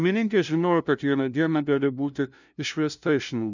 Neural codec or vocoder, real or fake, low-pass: codec, 16 kHz, 0.5 kbps, FunCodec, trained on LibriTTS, 25 frames a second; fake; 7.2 kHz